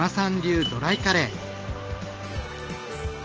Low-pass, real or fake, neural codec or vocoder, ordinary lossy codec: 7.2 kHz; real; none; Opus, 16 kbps